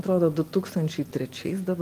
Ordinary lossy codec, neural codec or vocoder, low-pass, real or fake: Opus, 24 kbps; none; 14.4 kHz; real